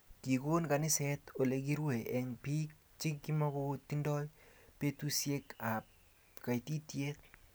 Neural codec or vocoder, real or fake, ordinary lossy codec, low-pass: none; real; none; none